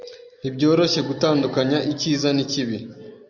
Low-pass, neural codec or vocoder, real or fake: 7.2 kHz; none; real